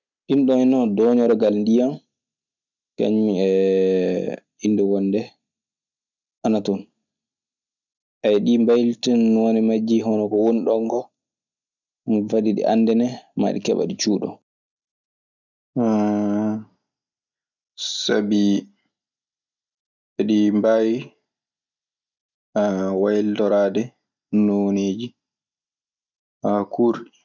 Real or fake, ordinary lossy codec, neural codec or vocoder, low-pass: real; none; none; 7.2 kHz